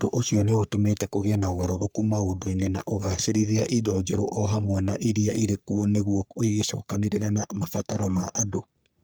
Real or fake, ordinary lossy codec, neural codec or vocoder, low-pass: fake; none; codec, 44.1 kHz, 3.4 kbps, Pupu-Codec; none